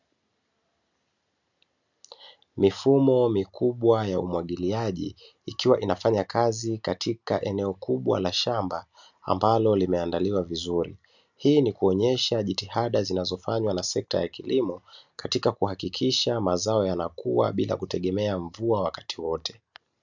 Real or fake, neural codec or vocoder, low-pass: real; none; 7.2 kHz